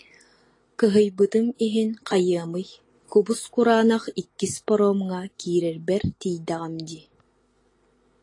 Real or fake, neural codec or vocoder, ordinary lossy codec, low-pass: real; none; AAC, 32 kbps; 10.8 kHz